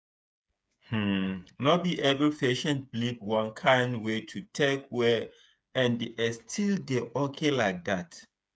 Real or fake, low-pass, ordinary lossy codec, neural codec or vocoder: fake; none; none; codec, 16 kHz, 8 kbps, FreqCodec, smaller model